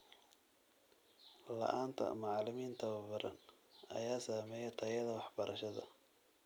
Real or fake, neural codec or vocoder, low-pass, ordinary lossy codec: real; none; none; none